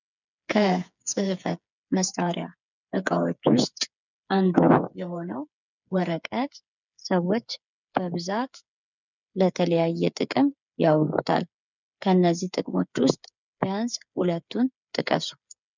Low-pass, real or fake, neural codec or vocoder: 7.2 kHz; fake; codec, 16 kHz, 8 kbps, FreqCodec, smaller model